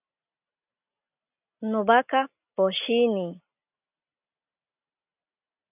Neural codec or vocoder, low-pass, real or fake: none; 3.6 kHz; real